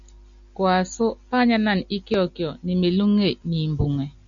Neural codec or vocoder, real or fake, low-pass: none; real; 7.2 kHz